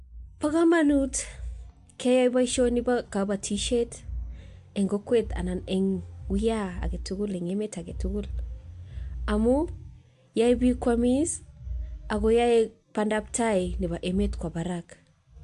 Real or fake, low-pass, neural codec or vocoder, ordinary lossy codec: real; 10.8 kHz; none; AAC, 64 kbps